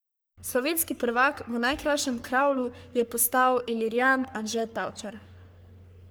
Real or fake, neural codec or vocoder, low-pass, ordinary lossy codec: fake; codec, 44.1 kHz, 3.4 kbps, Pupu-Codec; none; none